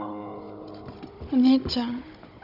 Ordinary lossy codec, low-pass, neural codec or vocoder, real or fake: none; 5.4 kHz; codec, 16 kHz, 16 kbps, FunCodec, trained on Chinese and English, 50 frames a second; fake